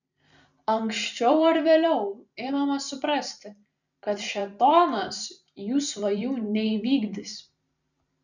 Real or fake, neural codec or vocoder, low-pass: fake; vocoder, 44.1 kHz, 128 mel bands every 512 samples, BigVGAN v2; 7.2 kHz